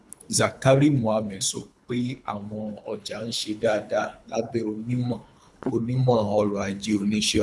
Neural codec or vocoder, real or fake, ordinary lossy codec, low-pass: codec, 24 kHz, 3 kbps, HILCodec; fake; none; none